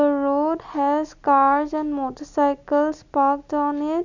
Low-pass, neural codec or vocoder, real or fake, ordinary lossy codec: 7.2 kHz; none; real; none